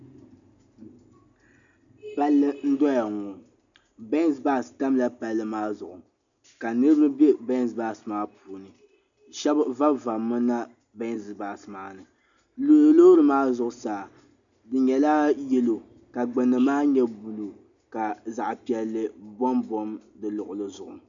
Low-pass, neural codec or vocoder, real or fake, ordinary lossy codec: 7.2 kHz; none; real; MP3, 96 kbps